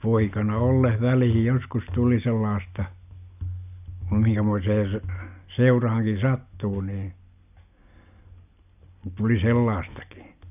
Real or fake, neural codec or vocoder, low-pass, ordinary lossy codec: real; none; 3.6 kHz; none